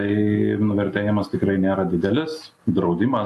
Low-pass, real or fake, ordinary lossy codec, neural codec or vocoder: 14.4 kHz; real; AAC, 64 kbps; none